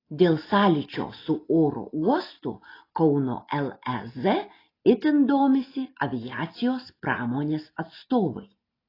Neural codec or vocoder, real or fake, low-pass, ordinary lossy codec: none; real; 5.4 kHz; AAC, 24 kbps